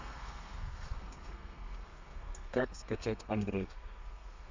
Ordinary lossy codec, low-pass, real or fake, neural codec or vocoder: MP3, 64 kbps; 7.2 kHz; fake; codec, 32 kHz, 1.9 kbps, SNAC